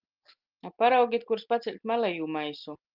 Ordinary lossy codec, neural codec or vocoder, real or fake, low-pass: Opus, 24 kbps; none; real; 5.4 kHz